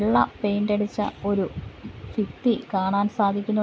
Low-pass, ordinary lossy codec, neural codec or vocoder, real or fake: none; none; none; real